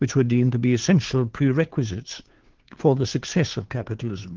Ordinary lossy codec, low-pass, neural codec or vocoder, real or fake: Opus, 16 kbps; 7.2 kHz; codec, 16 kHz, 2 kbps, FunCodec, trained on Chinese and English, 25 frames a second; fake